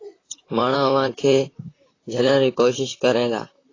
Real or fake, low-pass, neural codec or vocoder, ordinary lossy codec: fake; 7.2 kHz; vocoder, 44.1 kHz, 128 mel bands, Pupu-Vocoder; AAC, 32 kbps